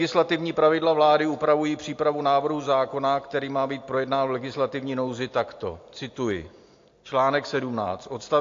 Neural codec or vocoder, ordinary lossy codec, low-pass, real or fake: none; AAC, 48 kbps; 7.2 kHz; real